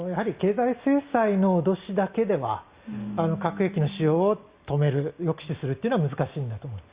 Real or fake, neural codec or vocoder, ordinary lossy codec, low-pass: real; none; Opus, 64 kbps; 3.6 kHz